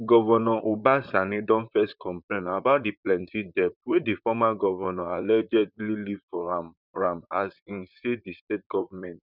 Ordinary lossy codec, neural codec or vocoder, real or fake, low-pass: none; vocoder, 44.1 kHz, 128 mel bands, Pupu-Vocoder; fake; 5.4 kHz